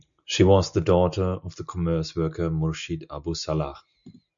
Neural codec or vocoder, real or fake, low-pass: none; real; 7.2 kHz